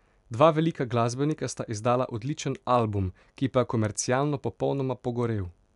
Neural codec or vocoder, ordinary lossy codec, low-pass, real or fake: none; none; 10.8 kHz; real